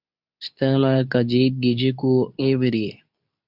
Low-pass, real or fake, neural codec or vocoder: 5.4 kHz; fake; codec, 24 kHz, 0.9 kbps, WavTokenizer, medium speech release version 2